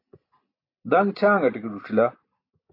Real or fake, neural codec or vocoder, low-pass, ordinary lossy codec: real; none; 5.4 kHz; AAC, 32 kbps